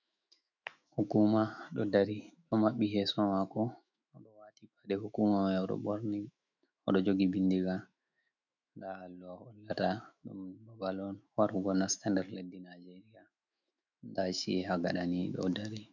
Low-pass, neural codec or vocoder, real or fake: 7.2 kHz; autoencoder, 48 kHz, 128 numbers a frame, DAC-VAE, trained on Japanese speech; fake